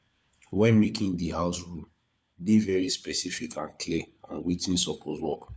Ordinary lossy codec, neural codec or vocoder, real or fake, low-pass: none; codec, 16 kHz, 4 kbps, FunCodec, trained on LibriTTS, 50 frames a second; fake; none